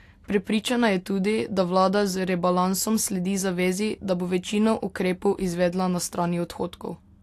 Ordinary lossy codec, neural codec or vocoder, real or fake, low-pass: AAC, 64 kbps; none; real; 14.4 kHz